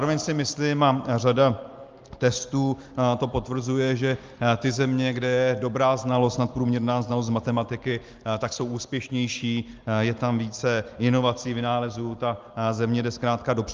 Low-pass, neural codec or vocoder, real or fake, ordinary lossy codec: 7.2 kHz; none; real; Opus, 32 kbps